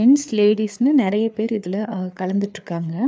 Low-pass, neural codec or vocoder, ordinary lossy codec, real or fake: none; codec, 16 kHz, 4 kbps, FreqCodec, larger model; none; fake